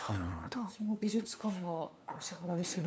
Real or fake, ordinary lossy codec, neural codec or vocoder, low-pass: fake; none; codec, 16 kHz, 2 kbps, FunCodec, trained on LibriTTS, 25 frames a second; none